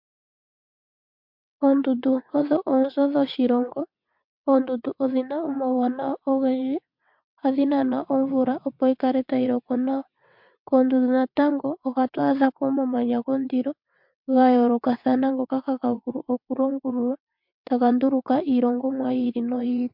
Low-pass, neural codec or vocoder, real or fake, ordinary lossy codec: 5.4 kHz; vocoder, 22.05 kHz, 80 mel bands, WaveNeXt; fake; MP3, 48 kbps